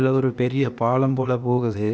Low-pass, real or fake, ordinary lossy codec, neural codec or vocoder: none; fake; none; codec, 16 kHz, 0.8 kbps, ZipCodec